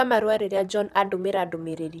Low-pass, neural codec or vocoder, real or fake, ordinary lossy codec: 14.4 kHz; vocoder, 44.1 kHz, 128 mel bands, Pupu-Vocoder; fake; none